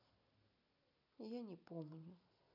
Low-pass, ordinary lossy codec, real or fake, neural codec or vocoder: 5.4 kHz; none; real; none